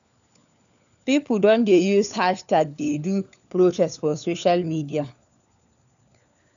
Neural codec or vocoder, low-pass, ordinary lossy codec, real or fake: codec, 16 kHz, 4 kbps, FunCodec, trained on LibriTTS, 50 frames a second; 7.2 kHz; none; fake